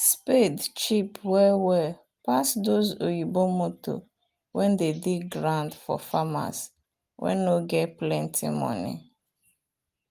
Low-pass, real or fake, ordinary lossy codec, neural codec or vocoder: 14.4 kHz; real; Opus, 32 kbps; none